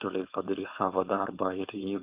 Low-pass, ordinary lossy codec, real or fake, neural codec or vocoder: 3.6 kHz; Opus, 32 kbps; fake; codec, 16 kHz, 4.8 kbps, FACodec